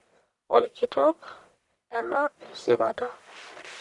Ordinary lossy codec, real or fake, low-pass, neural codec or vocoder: none; fake; 10.8 kHz; codec, 44.1 kHz, 1.7 kbps, Pupu-Codec